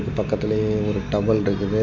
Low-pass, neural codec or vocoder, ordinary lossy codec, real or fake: 7.2 kHz; none; MP3, 48 kbps; real